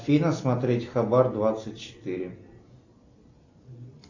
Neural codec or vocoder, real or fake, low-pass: none; real; 7.2 kHz